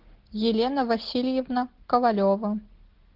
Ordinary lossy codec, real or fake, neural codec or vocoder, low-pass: Opus, 16 kbps; real; none; 5.4 kHz